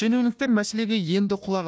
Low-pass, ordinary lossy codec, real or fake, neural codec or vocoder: none; none; fake; codec, 16 kHz, 1 kbps, FunCodec, trained on Chinese and English, 50 frames a second